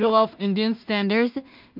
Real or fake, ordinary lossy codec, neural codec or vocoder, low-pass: fake; MP3, 48 kbps; codec, 16 kHz in and 24 kHz out, 0.4 kbps, LongCat-Audio-Codec, two codebook decoder; 5.4 kHz